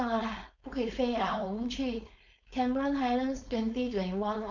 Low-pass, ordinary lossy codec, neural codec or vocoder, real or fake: 7.2 kHz; none; codec, 16 kHz, 4.8 kbps, FACodec; fake